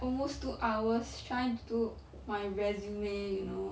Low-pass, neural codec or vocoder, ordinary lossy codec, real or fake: none; none; none; real